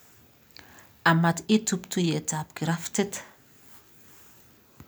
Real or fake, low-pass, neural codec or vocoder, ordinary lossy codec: real; none; none; none